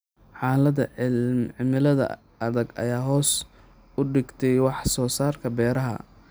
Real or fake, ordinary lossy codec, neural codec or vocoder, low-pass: real; none; none; none